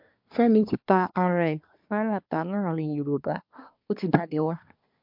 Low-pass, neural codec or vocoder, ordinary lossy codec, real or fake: 5.4 kHz; codec, 24 kHz, 1 kbps, SNAC; MP3, 48 kbps; fake